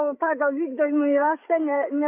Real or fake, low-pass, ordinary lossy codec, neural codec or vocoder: fake; 3.6 kHz; AAC, 24 kbps; codec, 16 kHz, 8 kbps, FreqCodec, larger model